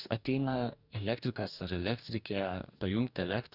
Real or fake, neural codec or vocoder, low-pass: fake; codec, 44.1 kHz, 2.6 kbps, DAC; 5.4 kHz